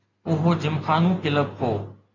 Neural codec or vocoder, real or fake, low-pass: none; real; 7.2 kHz